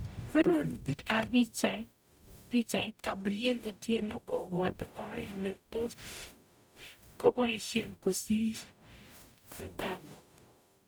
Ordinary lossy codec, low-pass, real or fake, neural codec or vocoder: none; none; fake; codec, 44.1 kHz, 0.9 kbps, DAC